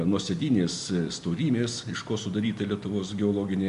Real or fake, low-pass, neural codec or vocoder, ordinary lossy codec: real; 10.8 kHz; none; MP3, 64 kbps